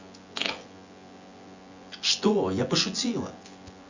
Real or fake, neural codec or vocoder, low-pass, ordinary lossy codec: fake; vocoder, 24 kHz, 100 mel bands, Vocos; 7.2 kHz; Opus, 64 kbps